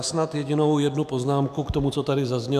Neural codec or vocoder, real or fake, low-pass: autoencoder, 48 kHz, 128 numbers a frame, DAC-VAE, trained on Japanese speech; fake; 14.4 kHz